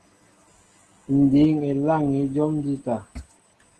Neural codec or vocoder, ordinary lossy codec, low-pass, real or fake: none; Opus, 16 kbps; 10.8 kHz; real